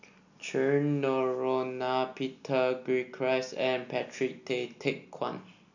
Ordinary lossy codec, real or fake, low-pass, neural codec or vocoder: none; real; 7.2 kHz; none